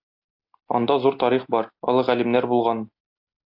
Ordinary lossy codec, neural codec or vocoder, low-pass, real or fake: AAC, 48 kbps; none; 5.4 kHz; real